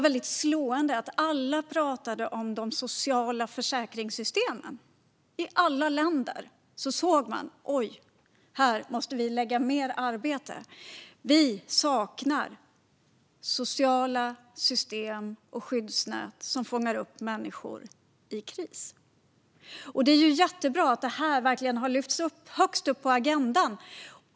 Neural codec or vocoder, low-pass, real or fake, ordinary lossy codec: none; none; real; none